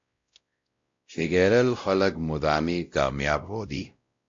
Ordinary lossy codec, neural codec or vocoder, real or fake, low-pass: MP3, 48 kbps; codec, 16 kHz, 0.5 kbps, X-Codec, WavLM features, trained on Multilingual LibriSpeech; fake; 7.2 kHz